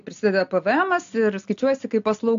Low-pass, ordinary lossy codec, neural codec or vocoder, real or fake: 7.2 kHz; AAC, 64 kbps; none; real